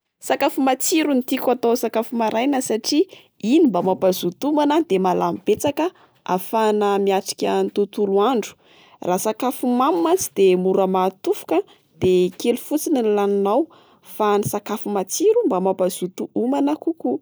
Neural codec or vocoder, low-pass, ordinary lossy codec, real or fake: none; none; none; real